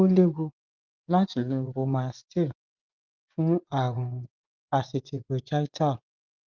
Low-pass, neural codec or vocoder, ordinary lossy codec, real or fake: 7.2 kHz; vocoder, 24 kHz, 100 mel bands, Vocos; Opus, 32 kbps; fake